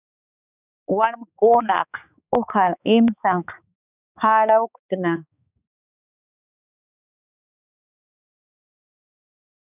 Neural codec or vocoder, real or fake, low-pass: codec, 16 kHz, 4 kbps, X-Codec, HuBERT features, trained on balanced general audio; fake; 3.6 kHz